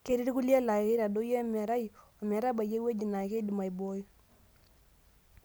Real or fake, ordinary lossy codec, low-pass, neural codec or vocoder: real; none; none; none